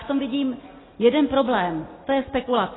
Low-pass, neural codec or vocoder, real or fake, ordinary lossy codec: 7.2 kHz; none; real; AAC, 16 kbps